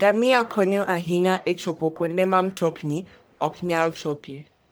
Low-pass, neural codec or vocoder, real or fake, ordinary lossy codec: none; codec, 44.1 kHz, 1.7 kbps, Pupu-Codec; fake; none